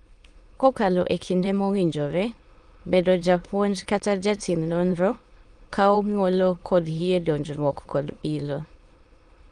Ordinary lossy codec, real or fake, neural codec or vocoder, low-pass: Opus, 24 kbps; fake; autoencoder, 22.05 kHz, a latent of 192 numbers a frame, VITS, trained on many speakers; 9.9 kHz